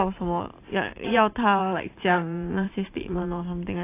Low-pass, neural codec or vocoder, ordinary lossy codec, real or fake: 3.6 kHz; vocoder, 44.1 kHz, 128 mel bands every 512 samples, BigVGAN v2; AAC, 24 kbps; fake